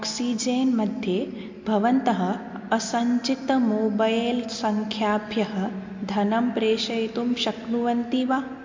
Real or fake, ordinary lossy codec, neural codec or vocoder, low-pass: real; MP3, 48 kbps; none; 7.2 kHz